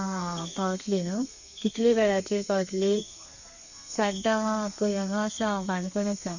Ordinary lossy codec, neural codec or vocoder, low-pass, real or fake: none; codec, 32 kHz, 1.9 kbps, SNAC; 7.2 kHz; fake